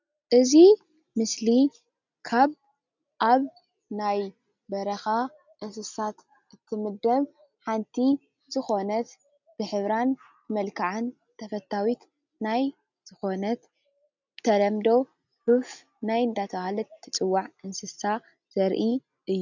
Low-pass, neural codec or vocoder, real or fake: 7.2 kHz; none; real